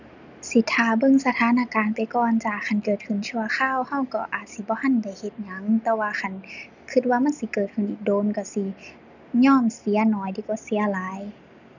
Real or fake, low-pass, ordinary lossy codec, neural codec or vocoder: real; 7.2 kHz; none; none